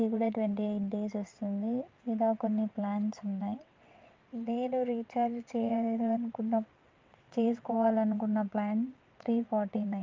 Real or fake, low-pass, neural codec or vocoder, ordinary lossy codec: fake; 7.2 kHz; vocoder, 22.05 kHz, 80 mel bands, WaveNeXt; Opus, 24 kbps